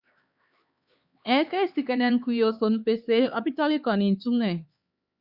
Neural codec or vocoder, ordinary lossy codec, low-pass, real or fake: codec, 16 kHz, 4 kbps, X-Codec, HuBERT features, trained on LibriSpeech; Opus, 64 kbps; 5.4 kHz; fake